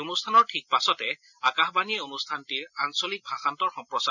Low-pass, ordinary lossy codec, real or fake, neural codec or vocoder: 7.2 kHz; none; real; none